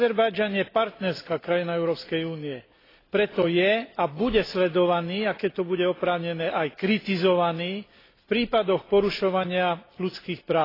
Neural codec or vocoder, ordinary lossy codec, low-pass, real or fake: none; AAC, 24 kbps; 5.4 kHz; real